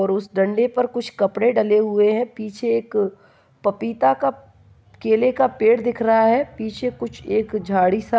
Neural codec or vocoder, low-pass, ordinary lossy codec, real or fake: none; none; none; real